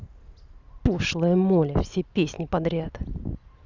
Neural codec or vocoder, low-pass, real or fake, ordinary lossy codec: none; 7.2 kHz; real; Opus, 64 kbps